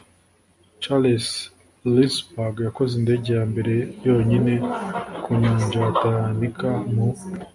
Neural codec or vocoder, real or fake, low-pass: none; real; 10.8 kHz